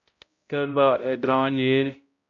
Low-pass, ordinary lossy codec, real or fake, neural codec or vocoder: 7.2 kHz; MP3, 48 kbps; fake; codec, 16 kHz, 0.5 kbps, X-Codec, HuBERT features, trained on balanced general audio